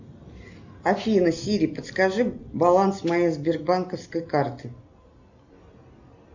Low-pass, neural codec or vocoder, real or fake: 7.2 kHz; none; real